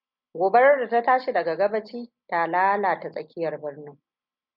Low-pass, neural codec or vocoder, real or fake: 5.4 kHz; none; real